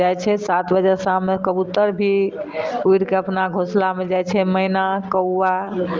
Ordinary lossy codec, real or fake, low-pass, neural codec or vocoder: Opus, 32 kbps; real; 7.2 kHz; none